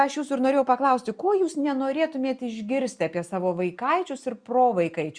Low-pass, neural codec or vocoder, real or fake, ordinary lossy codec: 9.9 kHz; none; real; Opus, 64 kbps